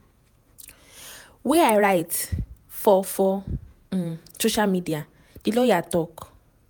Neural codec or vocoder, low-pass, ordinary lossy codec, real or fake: vocoder, 48 kHz, 128 mel bands, Vocos; none; none; fake